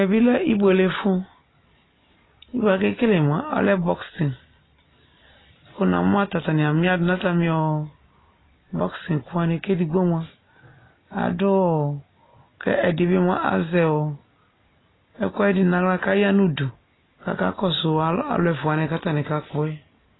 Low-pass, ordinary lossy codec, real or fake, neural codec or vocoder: 7.2 kHz; AAC, 16 kbps; real; none